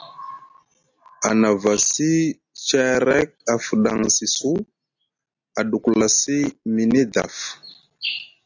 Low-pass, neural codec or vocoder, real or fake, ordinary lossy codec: 7.2 kHz; none; real; AAC, 48 kbps